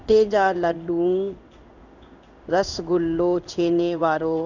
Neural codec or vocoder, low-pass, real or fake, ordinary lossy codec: codec, 16 kHz in and 24 kHz out, 1 kbps, XY-Tokenizer; 7.2 kHz; fake; none